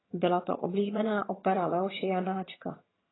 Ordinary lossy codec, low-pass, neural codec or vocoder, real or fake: AAC, 16 kbps; 7.2 kHz; vocoder, 22.05 kHz, 80 mel bands, HiFi-GAN; fake